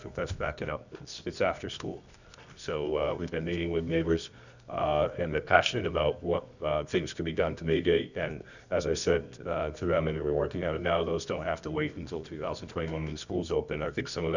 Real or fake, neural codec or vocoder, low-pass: fake; codec, 24 kHz, 0.9 kbps, WavTokenizer, medium music audio release; 7.2 kHz